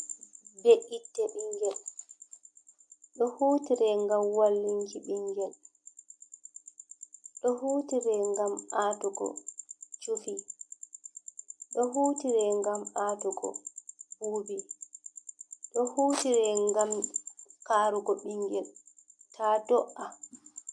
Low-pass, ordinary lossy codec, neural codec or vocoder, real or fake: 9.9 kHz; MP3, 64 kbps; none; real